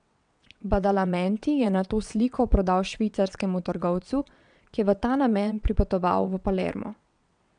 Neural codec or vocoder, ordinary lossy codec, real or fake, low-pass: vocoder, 22.05 kHz, 80 mel bands, WaveNeXt; none; fake; 9.9 kHz